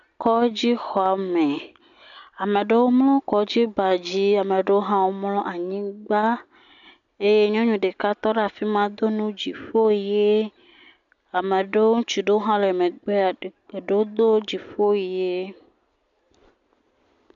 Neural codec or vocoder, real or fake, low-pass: none; real; 7.2 kHz